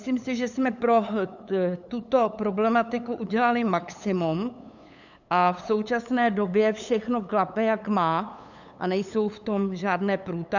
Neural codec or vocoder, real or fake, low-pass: codec, 16 kHz, 8 kbps, FunCodec, trained on LibriTTS, 25 frames a second; fake; 7.2 kHz